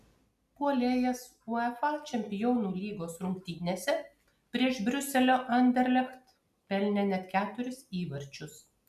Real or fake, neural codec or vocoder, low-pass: real; none; 14.4 kHz